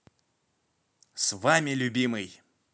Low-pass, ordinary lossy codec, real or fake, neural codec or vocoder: none; none; real; none